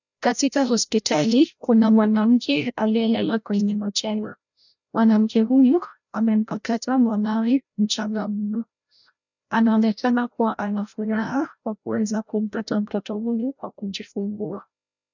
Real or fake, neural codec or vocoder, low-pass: fake; codec, 16 kHz, 0.5 kbps, FreqCodec, larger model; 7.2 kHz